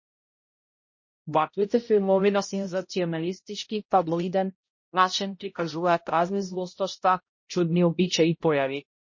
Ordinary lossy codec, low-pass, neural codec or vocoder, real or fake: MP3, 32 kbps; 7.2 kHz; codec, 16 kHz, 0.5 kbps, X-Codec, HuBERT features, trained on balanced general audio; fake